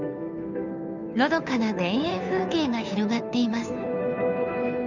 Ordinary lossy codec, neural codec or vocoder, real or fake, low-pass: none; codec, 16 kHz, 2 kbps, FunCodec, trained on Chinese and English, 25 frames a second; fake; 7.2 kHz